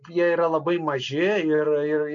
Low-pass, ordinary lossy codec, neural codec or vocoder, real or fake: 7.2 kHz; MP3, 64 kbps; none; real